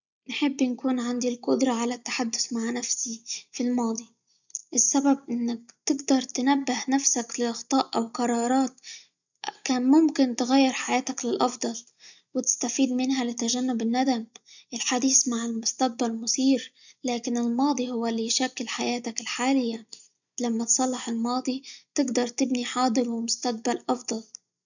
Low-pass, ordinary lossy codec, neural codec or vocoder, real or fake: 7.2 kHz; none; none; real